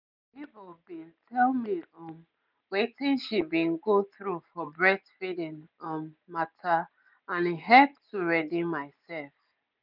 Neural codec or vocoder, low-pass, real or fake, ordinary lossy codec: none; 5.4 kHz; real; none